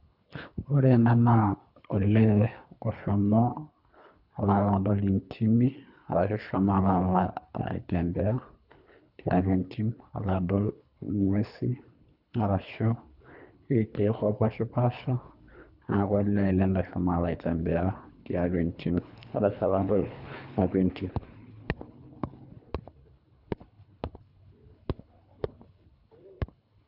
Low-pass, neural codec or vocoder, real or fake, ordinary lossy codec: 5.4 kHz; codec, 24 kHz, 3 kbps, HILCodec; fake; none